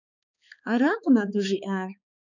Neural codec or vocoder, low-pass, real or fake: codec, 16 kHz, 4 kbps, X-Codec, HuBERT features, trained on balanced general audio; 7.2 kHz; fake